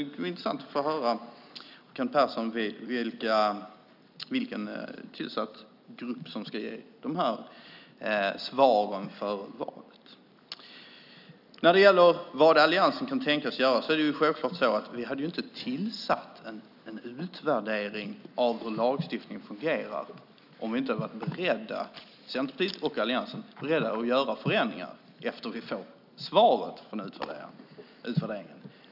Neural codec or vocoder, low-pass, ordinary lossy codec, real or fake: none; 5.4 kHz; none; real